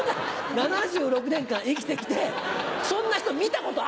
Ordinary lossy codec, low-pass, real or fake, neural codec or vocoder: none; none; real; none